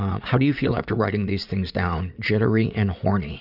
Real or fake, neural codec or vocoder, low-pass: fake; vocoder, 44.1 kHz, 80 mel bands, Vocos; 5.4 kHz